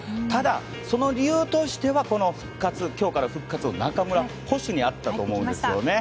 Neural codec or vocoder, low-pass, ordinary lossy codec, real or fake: none; none; none; real